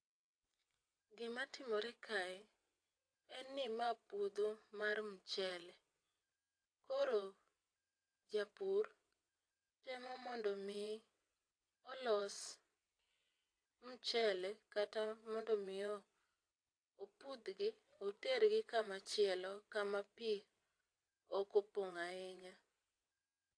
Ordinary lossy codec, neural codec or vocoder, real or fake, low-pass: AAC, 48 kbps; vocoder, 22.05 kHz, 80 mel bands, WaveNeXt; fake; 9.9 kHz